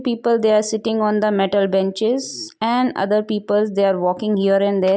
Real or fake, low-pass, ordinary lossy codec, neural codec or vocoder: real; none; none; none